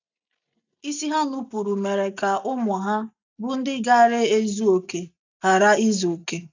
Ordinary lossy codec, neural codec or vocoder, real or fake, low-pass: none; none; real; 7.2 kHz